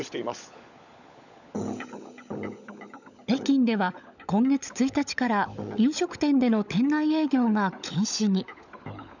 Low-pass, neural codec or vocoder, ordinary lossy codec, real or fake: 7.2 kHz; codec, 16 kHz, 16 kbps, FunCodec, trained on LibriTTS, 50 frames a second; none; fake